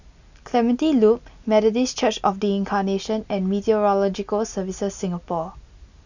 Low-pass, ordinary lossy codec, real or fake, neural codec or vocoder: 7.2 kHz; none; real; none